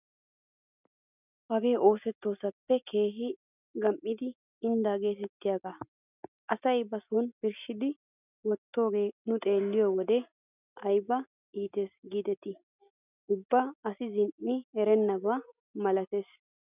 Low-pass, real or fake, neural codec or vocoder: 3.6 kHz; real; none